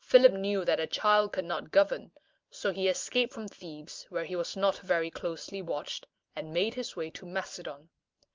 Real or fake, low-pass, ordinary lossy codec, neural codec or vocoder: real; 7.2 kHz; Opus, 32 kbps; none